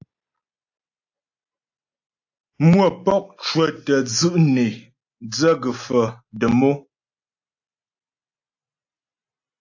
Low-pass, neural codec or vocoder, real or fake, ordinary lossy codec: 7.2 kHz; none; real; AAC, 48 kbps